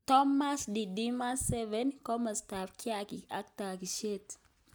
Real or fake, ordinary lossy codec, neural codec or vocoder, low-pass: real; none; none; none